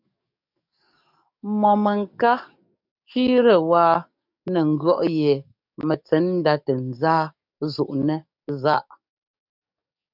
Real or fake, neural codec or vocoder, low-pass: fake; codec, 44.1 kHz, 7.8 kbps, DAC; 5.4 kHz